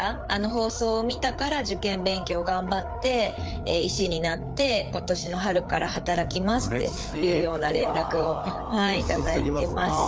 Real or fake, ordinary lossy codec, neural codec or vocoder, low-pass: fake; none; codec, 16 kHz, 4 kbps, FreqCodec, larger model; none